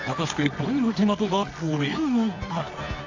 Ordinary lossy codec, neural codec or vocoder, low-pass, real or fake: AAC, 48 kbps; codec, 24 kHz, 0.9 kbps, WavTokenizer, medium music audio release; 7.2 kHz; fake